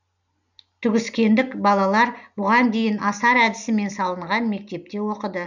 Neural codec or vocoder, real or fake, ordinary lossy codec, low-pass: none; real; none; 7.2 kHz